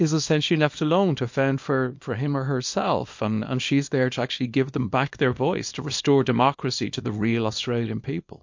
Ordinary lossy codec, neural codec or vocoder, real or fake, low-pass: MP3, 48 kbps; codec, 24 kHz, 0.9 kbps, WavTokenizer, small release; fake; 7.2 kHz